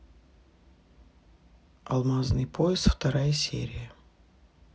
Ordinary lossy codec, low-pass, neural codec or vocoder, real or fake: none; none; none; real